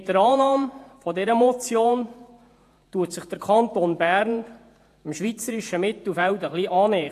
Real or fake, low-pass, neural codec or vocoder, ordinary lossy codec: fake; 14.4 kHz; vocoder, 48 kHz, 128 mel bands, Vocos; AAC, 64 kbps